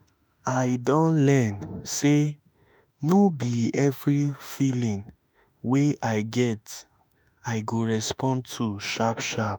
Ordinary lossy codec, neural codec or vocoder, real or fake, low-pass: none; autoencoder, 48 kHz, 32 numbers a frame, DAC-VAE, trained on Japanese speech; fake; none